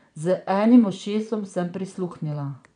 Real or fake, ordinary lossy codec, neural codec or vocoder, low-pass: fake; none; vocoder, 22.05 kHz, 80 mel bands, Vocos; 9.9 kHz